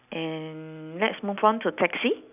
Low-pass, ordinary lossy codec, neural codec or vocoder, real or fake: 3.6 kHz; none; none; real